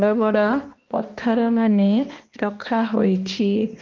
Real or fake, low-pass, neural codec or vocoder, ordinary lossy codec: fake; 7.2 kHz; codec, 16 kHz, 1 kbps, X-Codec, HuBERT features, trained on balanced general audio; Opus, 32 kbps